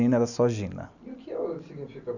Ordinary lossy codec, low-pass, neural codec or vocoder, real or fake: none; 7.2 kHz; none; real